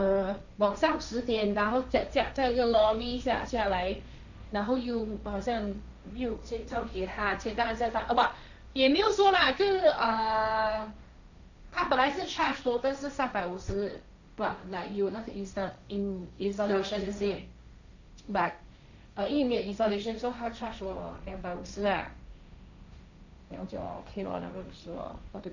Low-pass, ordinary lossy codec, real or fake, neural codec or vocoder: 7.2 kHz; none; fake; codec, 16 kHz, 1.1 kbps, Voila-Tokenizer